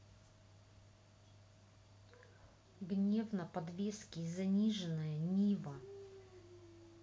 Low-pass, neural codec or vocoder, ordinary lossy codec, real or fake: none; none; none; real